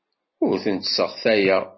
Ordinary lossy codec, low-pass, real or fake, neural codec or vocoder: MP3, 24 kbps; 7.2 kHz; real; none